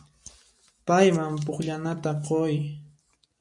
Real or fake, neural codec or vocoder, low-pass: real; none; 10.8 kHz